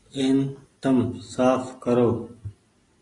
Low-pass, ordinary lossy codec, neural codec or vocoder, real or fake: 10.8 kHz; AAC, 32 kbps; none; real